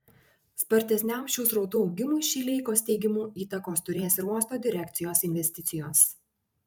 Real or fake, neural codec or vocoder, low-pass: fake; vocoder, 44.1 kHz, 128 mel bands every 512 samples, BigVGAN v2; 19.8 kHz